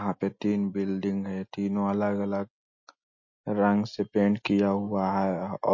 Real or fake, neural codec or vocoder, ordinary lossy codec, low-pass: real; none; MP3, 32 kbps; 7.2 kHz